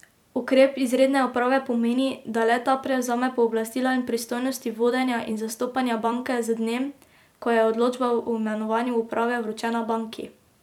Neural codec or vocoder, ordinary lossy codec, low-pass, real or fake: vocoder, 44.1 kHz, 128 mel bands every 256 samples, BigVGAN v2; none; 19.8 kHz; fake